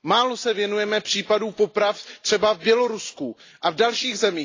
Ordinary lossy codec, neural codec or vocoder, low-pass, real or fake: AAC, 32 kbps; none; 7.2 kHz; real